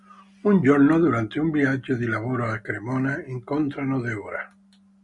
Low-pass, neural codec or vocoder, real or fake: 10.8 kHz; none; real